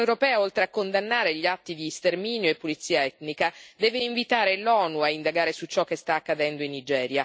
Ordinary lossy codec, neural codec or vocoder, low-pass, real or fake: none; none; none; real